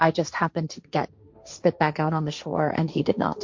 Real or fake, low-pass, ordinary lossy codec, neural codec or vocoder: fake; 7.2 kHz; MP3, 48 kbps; codec, 16 kHz, 1.1 kbps, Voila-Tokenizer